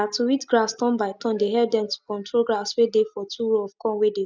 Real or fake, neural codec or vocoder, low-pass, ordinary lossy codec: real; none; none; none